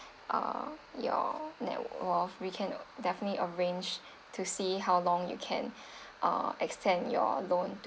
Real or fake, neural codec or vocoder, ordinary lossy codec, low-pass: real; none; none; none